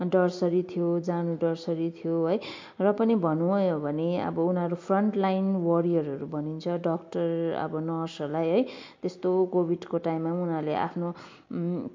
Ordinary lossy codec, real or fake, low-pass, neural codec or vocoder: MP3, 48 kbps; real; 7.2 kHz; none